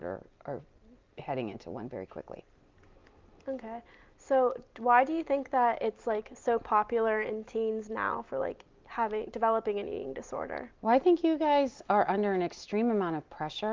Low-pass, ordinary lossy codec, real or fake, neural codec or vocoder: 7.2 kHz; Opus, 32 kbps; real; none